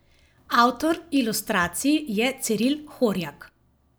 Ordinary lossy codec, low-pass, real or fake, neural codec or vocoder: none; none; real; none